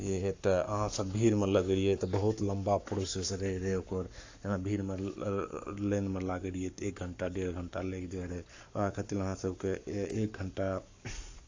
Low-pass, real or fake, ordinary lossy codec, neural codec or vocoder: 7.2 kHz; fake; AAC, 48 kbps; codec, 44.1 kHz, 7.8 kbps, Pupu-Codec